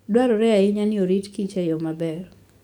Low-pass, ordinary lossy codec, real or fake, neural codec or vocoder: 19.8 kHz; none; fake; codec, 44.1 kHz, 7.8 kbps, DAC